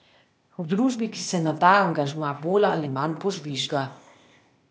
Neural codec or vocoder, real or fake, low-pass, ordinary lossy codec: codec, 16 kHz, 0.8 kbps, ZipCodec; fake; none; none